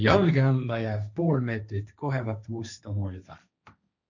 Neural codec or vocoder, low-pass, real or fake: codec, 16 kHz, 1.1 kbps, Voila-Tokenizer; 7.2 kHz; fake